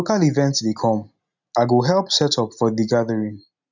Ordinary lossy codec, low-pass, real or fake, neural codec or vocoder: none; 7.2 kHz; real; none